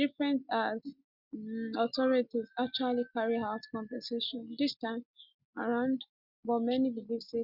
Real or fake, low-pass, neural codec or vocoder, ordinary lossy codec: real; 5.4 kHz; none; Opus, 64 kbps